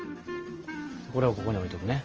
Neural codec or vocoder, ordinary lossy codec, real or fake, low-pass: none; Opus, 24 kbps; real; 7.2 kHz